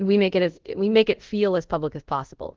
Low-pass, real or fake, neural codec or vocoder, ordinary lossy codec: 7.2 kHz; fake; codec, 24 kHz, 0.5 kbps, DualCodec; Opus, 16 kbps